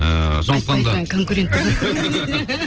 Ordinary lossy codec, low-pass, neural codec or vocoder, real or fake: Opus, 16 kbps; 7.2 kHz; none; real